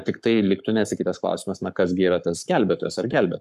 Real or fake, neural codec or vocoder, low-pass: fake; codec, 44.1 kHz, 7.8 kbps, Pupu-Codec; 14.4 kHz